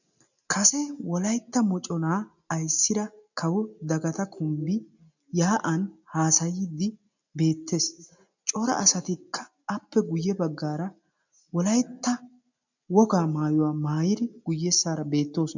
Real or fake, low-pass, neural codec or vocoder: real; 7.2 kHz; none